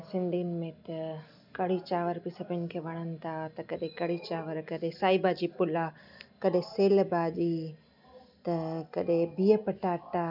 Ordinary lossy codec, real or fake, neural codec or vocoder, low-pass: none; real; none; 5.4 kHz